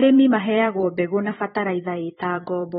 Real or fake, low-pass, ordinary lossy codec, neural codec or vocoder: fake; 19.8 kHz; AAC, 16 kbps; vocoder, 44.1 kHz, 128 mel bands every 512 samples, BigVGAN v2